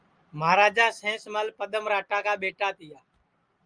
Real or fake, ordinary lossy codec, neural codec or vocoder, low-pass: real; Opus, 32 kbps; none; 9.9 kHz